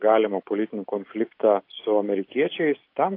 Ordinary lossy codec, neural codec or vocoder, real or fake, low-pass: AAC, 32 kbps; none; real; 5.4 kHz